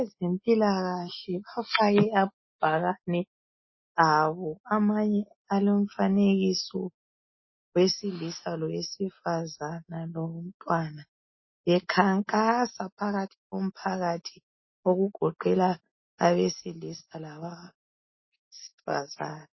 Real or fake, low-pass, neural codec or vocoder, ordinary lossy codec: real; 7.2 kHz; none; MP3, 24 kbps